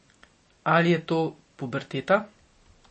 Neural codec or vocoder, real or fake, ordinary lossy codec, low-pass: none; real; MP3, 32 kbps; 9.9 kHz